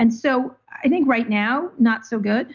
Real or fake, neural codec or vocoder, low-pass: real; none; 7.2 kHz